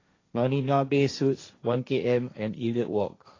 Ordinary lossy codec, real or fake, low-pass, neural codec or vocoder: AAC, 32 kbps; fake; 7.2 kHz; codec, 16 kHz, 1.1 kbps, Voila-Tokenizer